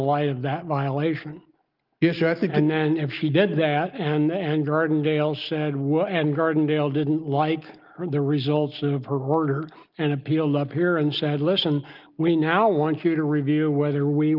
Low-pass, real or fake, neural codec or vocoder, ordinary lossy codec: 5.4 kHz; real; none; Opus, 32 kbps